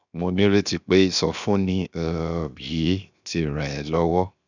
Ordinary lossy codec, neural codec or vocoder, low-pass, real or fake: none; codec, 16 kHz, 0.7 kbps, FocalCodec; 7.2 kHz; fake